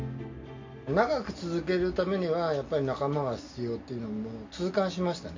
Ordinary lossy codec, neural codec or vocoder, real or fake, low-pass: MP3, 48 kbps; none; real; 7.2 kHz